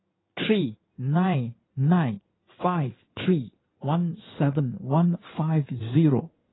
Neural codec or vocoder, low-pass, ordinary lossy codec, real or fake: codec, 16 kHz in and 24 kHz out, 2.2 kbps, FireRedTTS-2 codec; 7.2 kHz; AAC, 16 kbps; fake